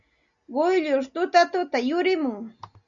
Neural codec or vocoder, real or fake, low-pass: none; real; 7.2 kHz